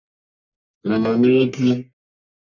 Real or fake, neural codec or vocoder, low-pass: fake; codec, 44.1 kHz, 1.7 kbps, Pupu-Codec; 7.2 kHz